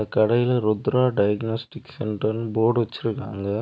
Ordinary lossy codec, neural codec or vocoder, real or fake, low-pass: none; none; real; none